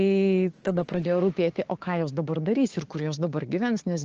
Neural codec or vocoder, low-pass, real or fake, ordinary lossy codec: codec, 16 kHz, 6 kbps, DAC; 7.2 kHz; fake; Opus, 16 kbps